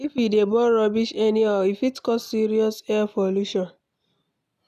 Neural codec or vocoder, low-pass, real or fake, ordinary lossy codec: none; 14.4 kHz; real; none